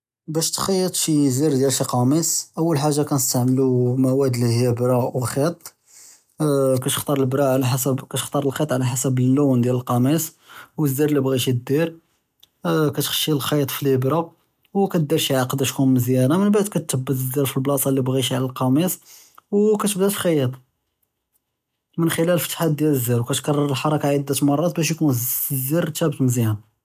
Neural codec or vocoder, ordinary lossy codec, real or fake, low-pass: none; none; real; 14.4 kHz